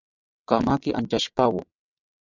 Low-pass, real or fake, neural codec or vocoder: 7.2 kHz; fake; vocoder, 22.05 kHz, 80 mel bands, WaveNeXt